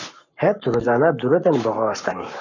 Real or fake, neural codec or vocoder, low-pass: fake; codec, 44.1 kHz, 7.8 kbps, Pupu-Codec; 7.2 kHz